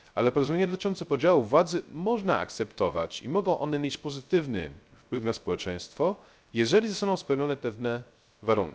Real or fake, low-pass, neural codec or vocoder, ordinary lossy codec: fake; none; codec, 16 kHz, 0.3 kbps, FocalCodec; none